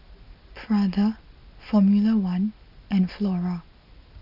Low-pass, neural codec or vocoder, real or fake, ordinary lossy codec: 5.4 kHz; none; real; none